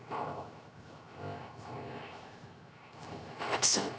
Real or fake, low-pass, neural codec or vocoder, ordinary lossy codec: fake; none; codec, 16 kHz, 0.3 kbps, FocalCodec; none